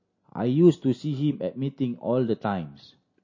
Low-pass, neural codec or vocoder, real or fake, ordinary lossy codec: 7.2 kHz; none; real; MP3, 32 kbps